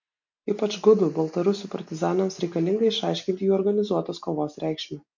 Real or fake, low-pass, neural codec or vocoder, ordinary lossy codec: real; 7.2 kHz; none; MP3, 48 kbps